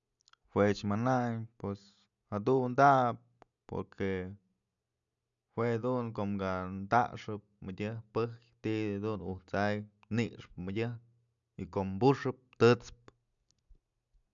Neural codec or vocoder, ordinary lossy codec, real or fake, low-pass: none; none; real; 7.2 kHz